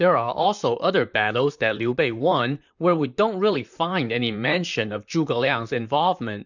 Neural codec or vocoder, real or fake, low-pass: vocoder, 44.1 kHz, 128 mel bands, Pupu-Vocoder; fake; 7.2 kHz